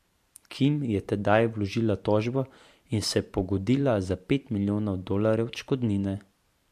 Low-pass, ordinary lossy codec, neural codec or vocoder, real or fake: 14.4 kHz; MP3, 64 kbps; vocoder, 44.1 kHz, 128 mel bands every 512 samples, BigVGAN v2; fake